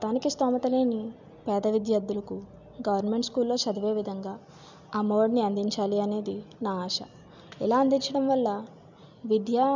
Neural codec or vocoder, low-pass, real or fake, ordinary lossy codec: none; 7.2 kHz; real; none